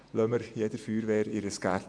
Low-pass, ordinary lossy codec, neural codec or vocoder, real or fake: 9.9 kHz; AAC, 64 kbps; vocoder, 48 kHz, 128 mel bands, Vocos; fake